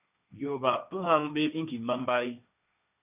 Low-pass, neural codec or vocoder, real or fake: 3.6 kHz; codec, 16 kHz, 1.1 kbps, Voila-Tokenizer; fake